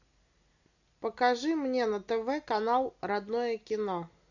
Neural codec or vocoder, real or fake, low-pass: none; real; 7.2 kHz